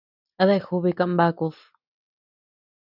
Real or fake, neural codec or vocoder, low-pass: real; none; 5.4 kHz